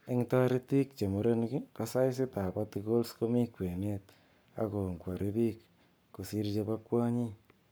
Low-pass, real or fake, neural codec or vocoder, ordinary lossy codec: none; fake; codec, 44.1 kHz, 7.8 kbps, Pupu-Codec; none